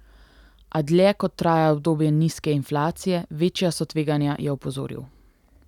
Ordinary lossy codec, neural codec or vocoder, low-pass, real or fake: none; none; 19.8 kHz; real